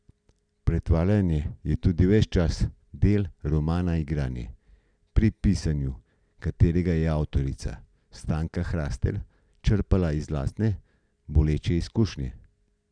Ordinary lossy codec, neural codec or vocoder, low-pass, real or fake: none; none; 9.9 kHz; real